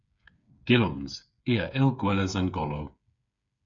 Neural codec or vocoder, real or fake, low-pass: codec, 16 kHz, 8 kbps, FreqCodec, smaller model; fake; 7.2 kHz